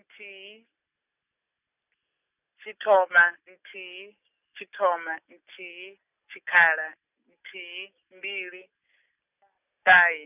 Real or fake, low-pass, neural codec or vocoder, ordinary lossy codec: real; 3.6 kHz; none; none